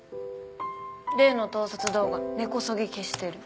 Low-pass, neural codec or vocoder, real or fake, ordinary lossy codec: none; none; real; none